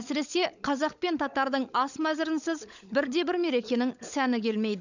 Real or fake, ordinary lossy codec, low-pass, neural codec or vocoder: fake; none; 7.2 kHz; codec, 16 kHz, 16 kbps, FunCodec, trained on LibriTTS, 50 frames a second